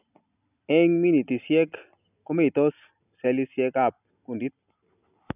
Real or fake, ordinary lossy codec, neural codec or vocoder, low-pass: real; none; none; 3.6 kHz